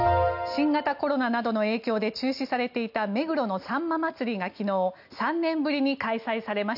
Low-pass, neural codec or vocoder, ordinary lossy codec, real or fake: 5.4 kHz; none; none; real